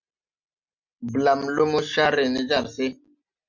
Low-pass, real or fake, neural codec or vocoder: 7.2 kHz; real; none